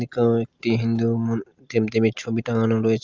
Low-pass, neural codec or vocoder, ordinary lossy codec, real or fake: 7.2 kHz; none; Opus, 32 kbps; real